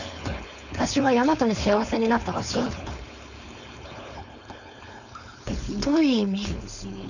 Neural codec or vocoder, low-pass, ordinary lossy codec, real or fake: codec, 16 kHz, 4.8 kbps, FACodec; 7.2 kHz; none; fake